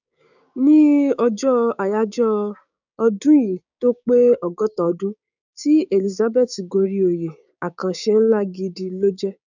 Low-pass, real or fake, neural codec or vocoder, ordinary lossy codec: 7.2 kHz; fake; codec, 16 kHz, 6 kbps, DAC; none